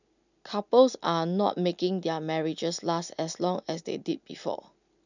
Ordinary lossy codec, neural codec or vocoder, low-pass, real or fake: none; none; 7.2 kHz; real